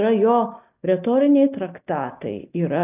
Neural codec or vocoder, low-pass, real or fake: none; 3.6 kHz; real